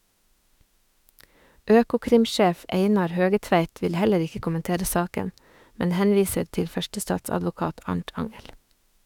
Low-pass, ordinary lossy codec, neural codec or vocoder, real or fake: 19.8 kHz; none; autoencoder, 48 kHz, 32 numbers a frame, DAC-VAE, trained on Japanese speech; fake